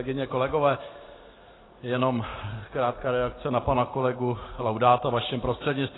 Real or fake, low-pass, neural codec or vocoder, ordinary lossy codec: real; 7.2 kHz; none; AAC, 16 kbps